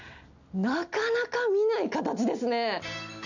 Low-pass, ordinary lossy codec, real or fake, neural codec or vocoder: 7.2 kHz; none; real; none